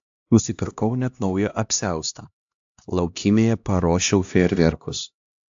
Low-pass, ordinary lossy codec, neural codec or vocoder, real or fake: 7.2 kHz; AAC, 64 kbps; codec, 16 kHz, 1 kbps, X-Codec, HuBERT features, trained on LibriSpeech; fake